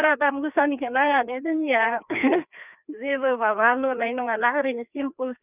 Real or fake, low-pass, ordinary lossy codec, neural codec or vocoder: fake; 3.6 kHz; none; codec, 16 kHz, 2 kbps, FreqCodec, larger model